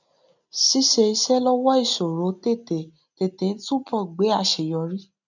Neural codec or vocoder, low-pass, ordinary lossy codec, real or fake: none; 7.2 kHz; none; real